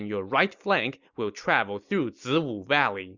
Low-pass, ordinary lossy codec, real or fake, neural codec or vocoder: 7.2 kHz; Opus, 64 kbps; real; none